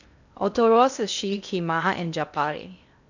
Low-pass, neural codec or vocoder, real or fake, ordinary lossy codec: 7.2 kHz; codec, 16 kHz in and 24 kHz out, 0.6 kbps, FocalCodec, streaming, 2048 codes; fake; none